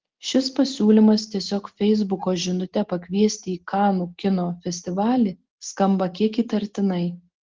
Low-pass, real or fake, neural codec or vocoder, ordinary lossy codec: 7.2 kHz; real; none; Opus, 16 kbps